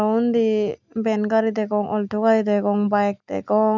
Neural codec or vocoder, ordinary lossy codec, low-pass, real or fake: none; none; 7.2 kHz; real